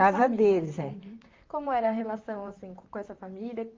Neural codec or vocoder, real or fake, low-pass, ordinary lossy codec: vocoder, 44.1 kHz, 128 mel bands, Pupu-Vocoder; fake; 7.2 kHz; Opus, 32 kbps